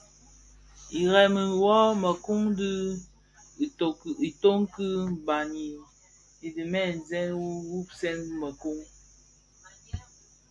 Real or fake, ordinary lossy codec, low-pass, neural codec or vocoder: real; AAC, 64 kbps; 10.8 kHz; none